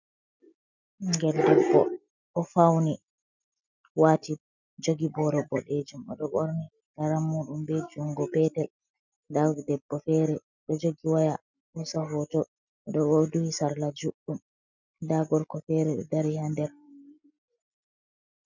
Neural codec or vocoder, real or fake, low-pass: none; real; 7.2 kHz